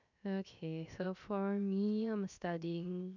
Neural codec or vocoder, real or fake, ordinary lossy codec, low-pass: codec, 16 kHz, 0.7 kbps, FocalCodec; fake; none; 7.2 kHz